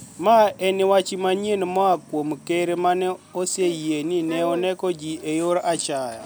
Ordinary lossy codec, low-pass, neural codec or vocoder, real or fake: none; none; none; real